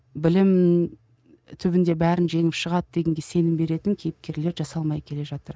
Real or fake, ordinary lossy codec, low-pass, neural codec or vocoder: real; none; none; none